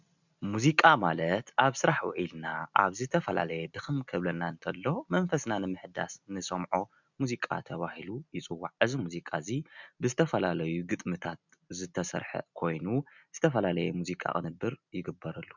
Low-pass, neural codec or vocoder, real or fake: 7.2 kHz; none; real